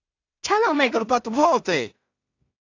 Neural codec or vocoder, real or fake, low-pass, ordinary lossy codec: codec, 16 kHz in and 24 kHz out, 0.4 kbps, LongCat-Audio-Codec, two codebook decoder; fake; 7.2 kHz; AAC, 48 kbps